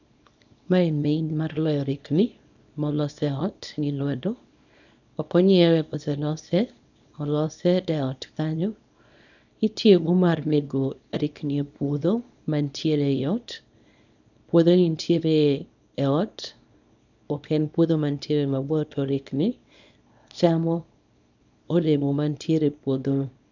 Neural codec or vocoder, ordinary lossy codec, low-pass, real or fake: codec, 24 kHz, 0.9 kbps, WavTokenizer, small release; none; 7.2 kHz; fake